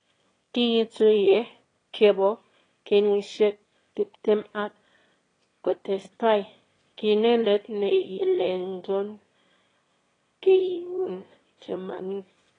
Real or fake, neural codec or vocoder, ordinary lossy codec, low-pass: fake; autoencoder, 22.05 kHz, a latent of 192 numbers a frame, VITS, trained on one speaker; AAC, 32 kbps; 9.9 kHz